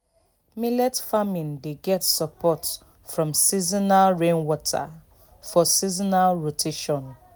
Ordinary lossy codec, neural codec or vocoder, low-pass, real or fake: none; none; none; real